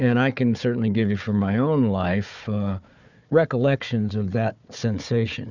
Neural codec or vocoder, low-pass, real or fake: codec, 16 kHz, 4 kbps, FunCodec, trained on Chinese and English, 50 frames a second; 7.2 kHz; fake